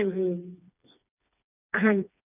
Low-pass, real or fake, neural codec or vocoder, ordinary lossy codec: 3.6 kHz; fake; codec, 16 kHz, 2 kbps, FreqCodec, smaller model; none